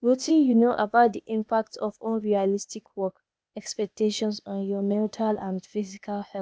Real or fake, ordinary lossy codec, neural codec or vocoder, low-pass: fake; none; codec, 16 kHz, 0.8 kbps, ZipCodec; none